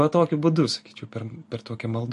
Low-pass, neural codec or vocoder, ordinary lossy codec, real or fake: 14.4 kHz; none; MP3, 48 kbps; real